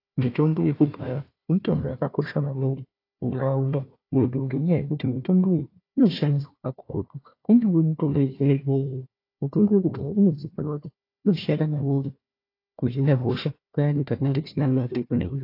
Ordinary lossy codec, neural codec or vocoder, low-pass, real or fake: AAC, 32 kbps; codec, 16 kHz, 1 kbps, FunCodec, trained on Chinese and English, 50 frames a second; 5.4 kHz; fake